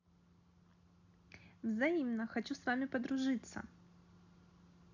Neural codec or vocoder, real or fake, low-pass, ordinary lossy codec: none; real; 7.2 kHz; none